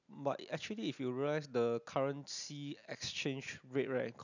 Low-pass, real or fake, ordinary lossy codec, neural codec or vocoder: 7.2 kHz; real; none; none